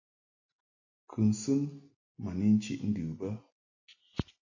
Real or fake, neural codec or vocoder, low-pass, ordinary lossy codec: real; none; 7.2 kHz; AAC, 48 kbps